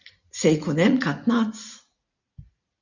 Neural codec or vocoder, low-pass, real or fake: vocoder, 44.1 kHz, 80 mel bands, Vocos; 7.2 kHz; fake